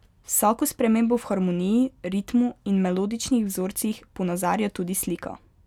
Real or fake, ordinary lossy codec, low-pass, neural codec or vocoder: real; none; 19.8 kHz; none